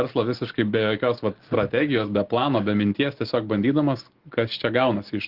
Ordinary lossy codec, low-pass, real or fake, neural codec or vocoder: Opus, 16 kbps; 5.4 kHz; real; none